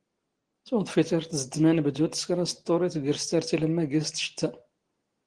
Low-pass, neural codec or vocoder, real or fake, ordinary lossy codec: 9.9 kHz; none; real; Opus, 16 kbps